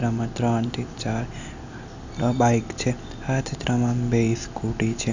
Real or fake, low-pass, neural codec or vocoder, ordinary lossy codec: real; 7.2 kHz; none; Opus, 64 kbps